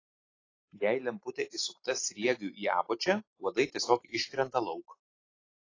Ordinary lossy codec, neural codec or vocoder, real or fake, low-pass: AAC, 32 kbps; none; real; 7.2 kHz